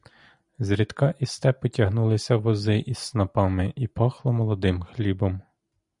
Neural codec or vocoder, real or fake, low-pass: none; real; 10.8 kHz